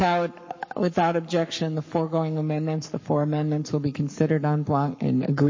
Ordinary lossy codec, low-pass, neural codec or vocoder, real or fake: MP3, 32 kbps; 7.2 kHz; codec, 44.1 kHz, 7.8 kbps, Pupu-Codec; fake